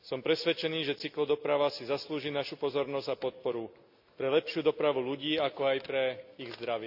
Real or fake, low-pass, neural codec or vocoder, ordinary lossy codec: real; 5.4 kHz; none; AAC, 48 kbps